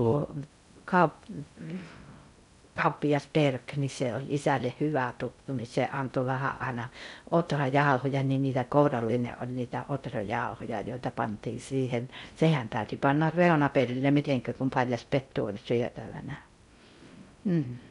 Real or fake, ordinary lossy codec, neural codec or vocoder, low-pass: fake; none; codec, 16 kHz in and 24 kHz out, 0.6 kbps, FocalCodec, streaming, 2048 codes; 10.8 kHz